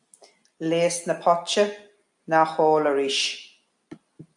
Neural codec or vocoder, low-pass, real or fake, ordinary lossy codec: none; 10.8 kHz; real; AAC, 64 kbps